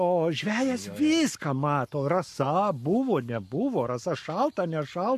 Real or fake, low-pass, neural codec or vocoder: fake; 14.4 kHz; codec, 44.1 kHz, 7.8 kbps, Pupu-Codec